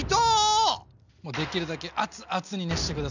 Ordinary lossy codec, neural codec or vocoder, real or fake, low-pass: none; none; real; 7.2 kHz